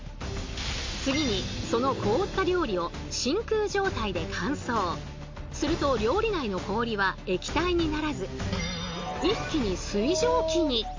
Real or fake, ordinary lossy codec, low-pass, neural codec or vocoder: real; MP3, 48 kbps; 7.2 kHz; none